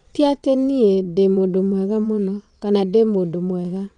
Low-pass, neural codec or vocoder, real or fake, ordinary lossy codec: 9.9 kHz; vocoder, 22.05 kHz, 80 mel bands, WaveNeXt; fake; none